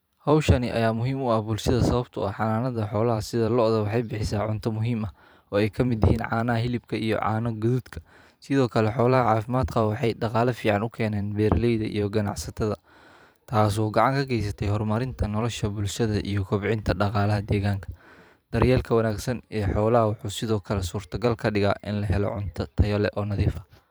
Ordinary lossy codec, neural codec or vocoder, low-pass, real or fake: none; vocoder, 44.1 kHz, 128 mel bands every 512 samples, BigVGAN v2; none; fake